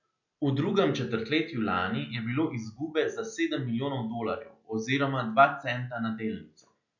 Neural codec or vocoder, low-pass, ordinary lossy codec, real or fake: none; 7.2 kHz; none; real